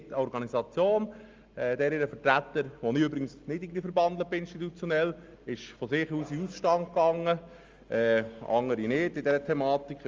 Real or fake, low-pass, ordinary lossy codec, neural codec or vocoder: real; 7.2 kHz; Opus, 32 kbps; none